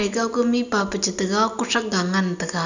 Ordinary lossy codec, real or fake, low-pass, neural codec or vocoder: none; real; 7.2 kHz; none